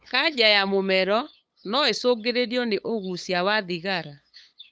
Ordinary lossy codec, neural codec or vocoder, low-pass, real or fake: none; codec, 16 kHz, 8 kbps, FunCodec, trained on LibriTTS, 25 frames a second; none; fake